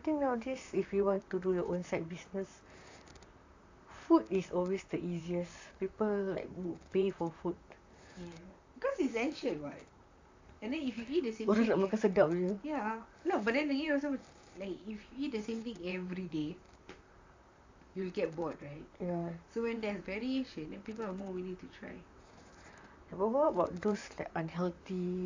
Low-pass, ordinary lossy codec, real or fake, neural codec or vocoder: 7.2 kHz; AAC, 48 kbps; fake; vocoder, 44.1 kHz, 128 mel bands, Pupu-Vocoder